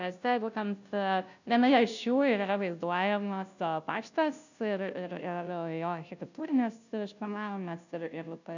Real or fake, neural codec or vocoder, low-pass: fake; codec, 16 kHz, 0.5 kbps, FunCodec, trained on Chinese and English, 25 frames a second; 7.2 kHz